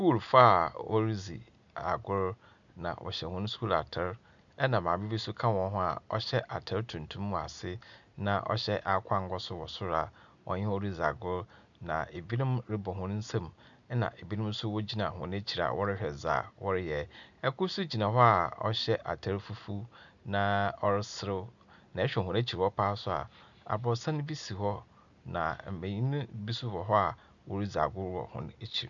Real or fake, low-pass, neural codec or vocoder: real; 7.2 kHz; none